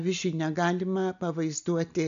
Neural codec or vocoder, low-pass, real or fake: none; 7.2 kHz; real